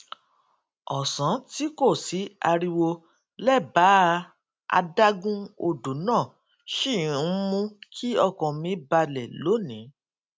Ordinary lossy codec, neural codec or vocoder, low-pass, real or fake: none; none; none; real